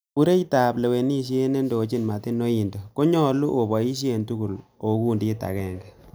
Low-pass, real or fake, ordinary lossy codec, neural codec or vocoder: none; real; none; none